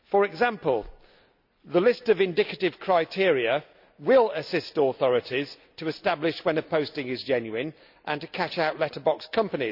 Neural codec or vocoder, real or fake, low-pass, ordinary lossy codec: none; real; 5.4 kHz; none